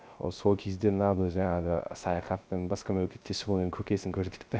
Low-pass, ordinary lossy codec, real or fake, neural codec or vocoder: none; none; fake; codec, 16 kHz, 0.3 kbps, FocalCodec